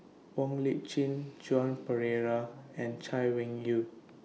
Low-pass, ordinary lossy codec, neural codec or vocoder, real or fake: none; none; none; real